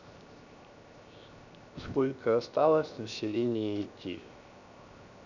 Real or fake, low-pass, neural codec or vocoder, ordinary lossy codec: fake; 7.2 kHz; codec, 16 kHz, 0.7 kbps, FocalCodec; none